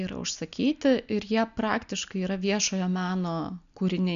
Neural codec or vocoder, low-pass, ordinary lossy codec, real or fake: none; 7.2 kHz; MP3, 96 kbps; real